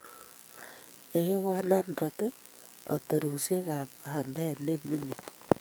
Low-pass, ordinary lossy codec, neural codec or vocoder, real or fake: none; none; codec, 44.1 kHz, 2.6 kbps, SNAC; fake